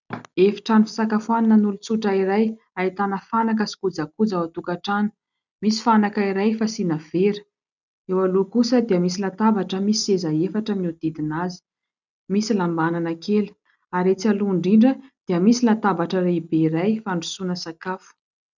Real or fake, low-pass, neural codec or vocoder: real; 7.2 kHz; none